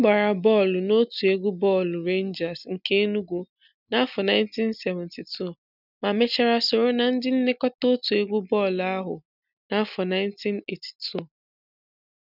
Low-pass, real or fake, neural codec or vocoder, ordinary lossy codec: 5.4 kHz; real; none; none